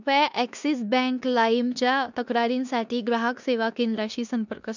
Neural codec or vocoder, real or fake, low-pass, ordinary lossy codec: codec, 16 kHz in and 24 kHz out, 0.9 kbps, LongCat-Audio-Codec, four codebook decoder; fake; 7.2 kHz; none